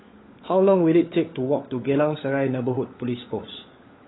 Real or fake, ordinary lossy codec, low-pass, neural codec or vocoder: fake; AAC, 16 kbps; 7.2 kHz; codec, 16 kHz, 16 kbps, FunCodec, trained on LibriTTS, 50 frames a second